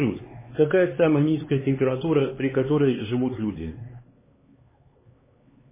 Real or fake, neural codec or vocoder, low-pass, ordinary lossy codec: fake; codec, 16 kHz, 4 kbps, X-Codec, HuBERT features, trained on LibriSpeech; 3.6 kHz; MP3, 16 kbps